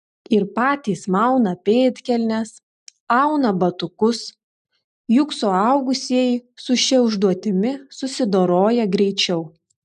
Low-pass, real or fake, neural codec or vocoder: 10.8 kHz; real; none